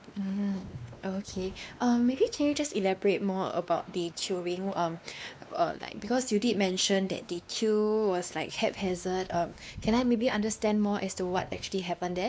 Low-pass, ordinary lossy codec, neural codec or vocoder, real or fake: none; none; codec, 16 kHz, 2 kbps, X-Codec, WavLM features, trained on Multilingual LibriSpeech; fake